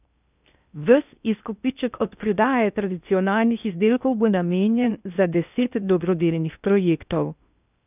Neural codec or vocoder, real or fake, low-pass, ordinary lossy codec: codec, 16 kHz in and 24 kHz out, 0.6 kbps, FocalCodec, streaming, 4096 codes; fake; 3.6 kHz; none